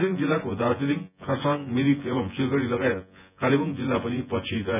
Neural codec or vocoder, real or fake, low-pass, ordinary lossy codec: vocoder, 24 kHz, 100 mel bands, Vocos; fake; 3.6 kHz; none